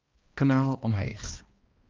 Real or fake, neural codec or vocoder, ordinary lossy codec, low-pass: fake; codec, 16 kHz, 1 kbps, X-Codec, HuBERT features, trained on balanced general audio; Opus, 24 kbps; 7.2 kHz